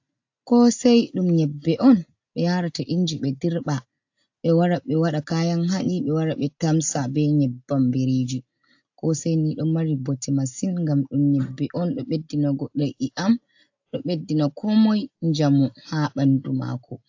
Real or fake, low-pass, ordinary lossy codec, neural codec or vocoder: real; 7.2 kHz; AAC, 48 kbps; none